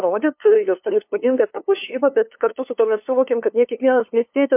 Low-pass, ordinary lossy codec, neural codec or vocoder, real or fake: 3.6 kHz; MP3, 32 kbps; codec, 16 kHz, 2 kbps, FunCodec, trained on LibriTTS, 25 frames a second; fake